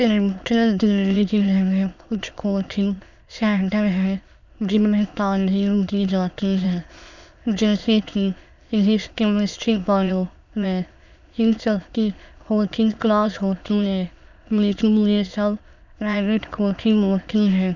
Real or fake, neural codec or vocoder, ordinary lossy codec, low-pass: fake; autoencoder, 22.05 kHz, a latent of 192 numbers a frame, VITS, trained on many speakers; none; 7.2 kHz